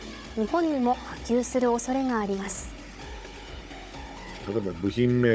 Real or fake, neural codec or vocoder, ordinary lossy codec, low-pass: fake; codec, 16 kHz, 16 kbps, FunCodec, trained on Chinese and English, 50 frames a second; none; none